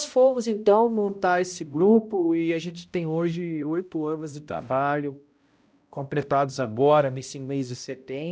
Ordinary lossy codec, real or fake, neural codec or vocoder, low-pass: none; fake; codec, 16 kHz, 0.5 kbps, X-Codec, HuBERT features, trained on balanced general audio; none